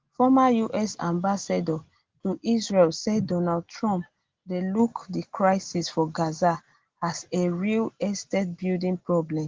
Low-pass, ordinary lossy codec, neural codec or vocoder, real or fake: 7.2 kHz; Opus, 16 kbps; none; real